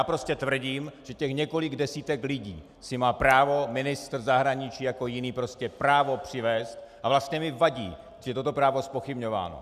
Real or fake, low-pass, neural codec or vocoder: real; 14.4 kHz; none